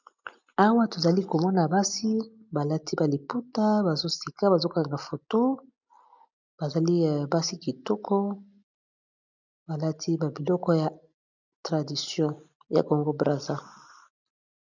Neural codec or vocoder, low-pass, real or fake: none; 7.2 kHz; real